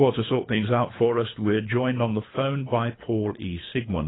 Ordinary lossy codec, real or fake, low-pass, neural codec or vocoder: AAC, 16 kbps; fake; 7.2 kHz; codec, 24 kHz, 3 kbps, HILCodec